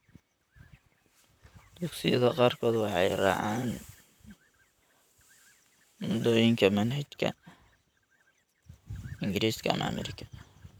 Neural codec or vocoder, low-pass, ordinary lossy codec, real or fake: vocoder, 44.1 kHz, 128 mel bands, Pupu-Vocoder; none; none; fake